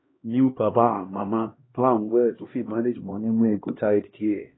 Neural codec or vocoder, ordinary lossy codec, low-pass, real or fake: codec, 16 kHz, 1 kbps, X-Codec, HuBERT features, trained on LibriSpeech; AAC, 16 kbps; 7.2 kHz; fake